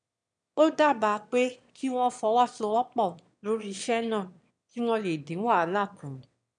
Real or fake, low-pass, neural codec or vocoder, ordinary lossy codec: fake; 9.9 kHz; autoencoder, 22.05 kHz, a latent of 192 numbers a frame, VITS, trained on one speaker; none